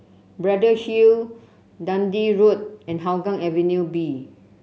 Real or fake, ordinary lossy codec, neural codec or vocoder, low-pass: real; none; none; none